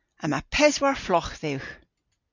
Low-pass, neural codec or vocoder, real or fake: 7.2 kHz; none; real